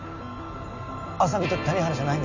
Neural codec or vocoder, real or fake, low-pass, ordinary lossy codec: none; real; 7.2 kHz; none